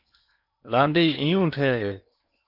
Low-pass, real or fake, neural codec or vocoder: 5.4 kHz; fake; codec, 16 kHz in and 24 kHz out, 0.8 kbps, FocalCodec, streaming, 65536 codes